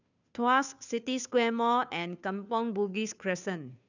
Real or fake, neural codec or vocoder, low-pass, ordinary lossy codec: fake; codec, 16 kHz, 2 kbps, FunCodec, trained on Chinese and English, 25 frames a second; 7.2 kHz; none